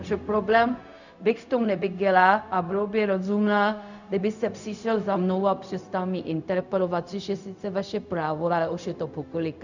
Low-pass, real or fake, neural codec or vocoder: 7.2 kHz; fake; codec, 16 kHz, 0.4 kbps, LongCat-Audio-Codec